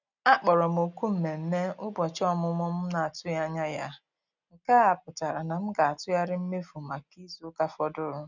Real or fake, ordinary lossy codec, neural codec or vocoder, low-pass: real; none; none; 7.2 kHz